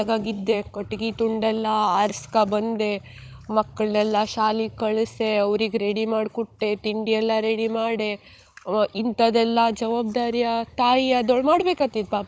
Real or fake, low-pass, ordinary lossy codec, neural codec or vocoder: fake; none; none; codec, 16 kHz, 16 kbps, FunCodec, trained on Chinese and English, 50 frames a second